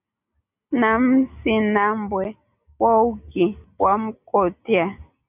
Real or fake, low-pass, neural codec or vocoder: real; 3.6 kHz; none